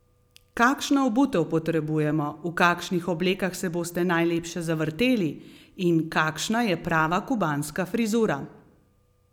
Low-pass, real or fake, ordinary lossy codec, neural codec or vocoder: 19.8 kHz; real; none; none